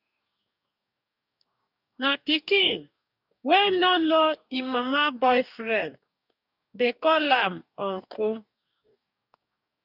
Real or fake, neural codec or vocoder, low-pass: fake; codec, 44.1 kHz, 2.6 kbps, DAC; 5.4 kHz